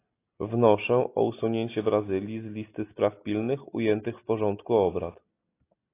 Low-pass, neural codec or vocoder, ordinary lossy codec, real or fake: 3.6 kHz; none; AAC, 24 kbps; real